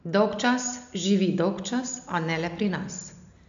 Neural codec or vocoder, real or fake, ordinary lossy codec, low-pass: none; real; none; 7.2 kHz